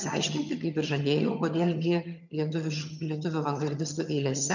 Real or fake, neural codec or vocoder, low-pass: fake; vocoder, 22.05 kHz, 80 mel bands, HiFi-GAN; 7.2 kHz